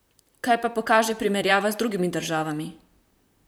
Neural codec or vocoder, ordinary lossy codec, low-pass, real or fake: vocoder, 44.1 kHz, 128 mel bands, Pupu-Vocoder; none; none; fake